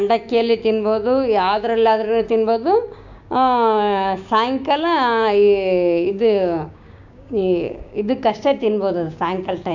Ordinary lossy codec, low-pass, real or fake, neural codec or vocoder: none; 7.2 kHz; fake; codec, 16 kHz, 6 kbps, DAC